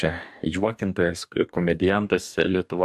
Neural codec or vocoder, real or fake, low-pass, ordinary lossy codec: codec, 44.1 kHz, 2.6 kbps, DAC; fake; 14.4 kHz; AAC, 96 kbps